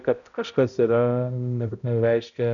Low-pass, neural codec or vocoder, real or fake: 7.2 kHz; codec, 16 kHz, 0.5 kbps, X-Codec, HuBERT features, trained on balanced general audio; fake